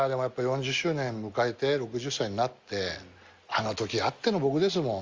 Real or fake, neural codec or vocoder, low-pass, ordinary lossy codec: real; none; 7.2 kHz; Opus, 32 kbps